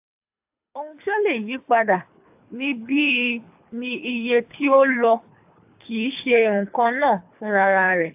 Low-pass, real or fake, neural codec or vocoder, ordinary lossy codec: 3.6 kHz; fake; codec, 24 kHz, 3 kbps, HILCodec; AAC, 32 kbps